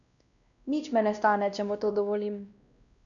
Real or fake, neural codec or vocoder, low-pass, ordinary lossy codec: fake; codec, 16 kHz, 1 kbps, X-Codec, WavLM features, trained on Multilingual LibriSpeech; 7.2 kHz; none